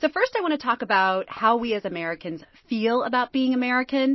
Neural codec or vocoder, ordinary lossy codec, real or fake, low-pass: none; MP3, 24 kbps; real; 7.2 kHz